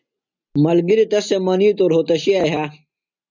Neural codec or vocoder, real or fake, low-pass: none; real; 7.2 kHz